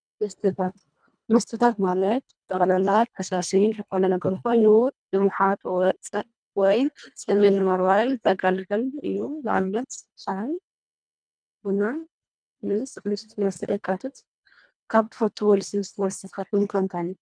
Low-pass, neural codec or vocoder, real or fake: 9.9 kHz; codec, 24 kHz, 1.5 kbps, HILCodec; fake